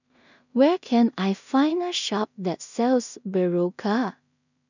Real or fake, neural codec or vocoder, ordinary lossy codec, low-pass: fake; codec, 16 kHz in and 24 kHz out, 0.4 kbps, LongCat-Audio-Codec, two codebook decoder; none; 7.2 kHz